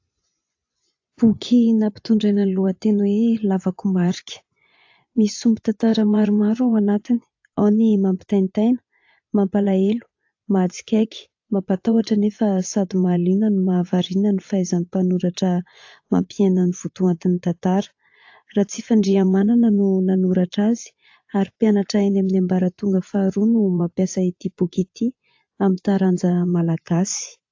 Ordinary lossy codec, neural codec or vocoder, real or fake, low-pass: AAC, 48 kbps; vocoder, 24 kHz, 100 mel bands, Vocos; fake; 7.2 kHz